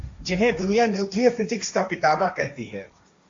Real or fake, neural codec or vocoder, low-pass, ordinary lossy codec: fake; codec, 16 kHz, 1.1 kbps, Voila-Tokenizer; 7.2 kHz; AAC, 48 kbps